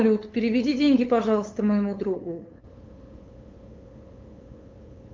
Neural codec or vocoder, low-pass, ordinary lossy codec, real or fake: codec, 16 kHz, 8 kbps, FunCodec, trained on LibriTTS, 25 frames a second; 7.2 kHz; Opus, 24 kbps; fake